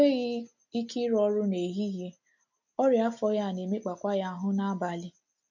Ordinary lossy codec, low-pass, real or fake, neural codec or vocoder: none; 7.2 kHz; real; none